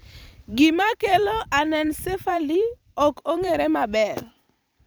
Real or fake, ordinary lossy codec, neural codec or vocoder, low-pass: fake; none; vocoder, 44.1 kHz, 128 mel bands every 256 samples, BigVGAN v2; none